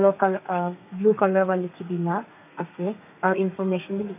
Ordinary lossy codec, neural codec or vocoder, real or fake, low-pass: none; codec, 32 kHz, 1.9 kbps, SNAC; fake; 3.6 kHz